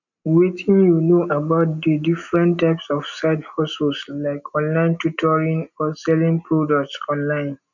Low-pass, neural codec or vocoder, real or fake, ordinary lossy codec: 7.2 kHz; none; real; none